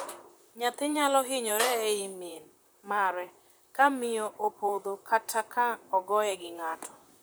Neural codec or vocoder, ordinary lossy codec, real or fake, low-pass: vocoder, 44.1 kHz, 128 mel bands, Pupu-Vocoder; none; fake; none